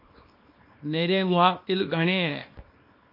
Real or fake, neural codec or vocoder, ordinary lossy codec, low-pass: fake; codec, 24 kHz, 0.9 kbps, WavTokenizer, small release; MP3, 32 kbps; 5.4 kHz